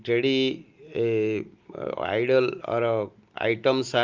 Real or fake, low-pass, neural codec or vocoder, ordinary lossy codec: real; 7.2 kHz; none; Opus, 32 kbps